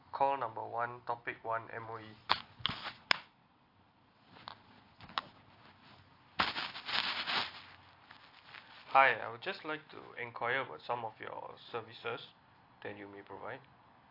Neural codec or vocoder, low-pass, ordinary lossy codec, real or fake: none; 5.4 kHz; AAC, 32 kbps; real